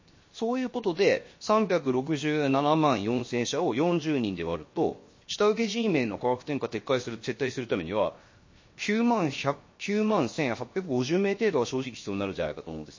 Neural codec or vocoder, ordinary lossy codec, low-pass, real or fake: codec, 16 kHz, 0.7 kbps, FocalCodec; MP3, 32 kbps; 7.2 kHz; fake